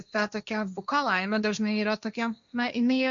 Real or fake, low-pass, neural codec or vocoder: fake; 7.2 kHz; codec, 16 kHz, 1.1 kbps, Voila-Tokenizer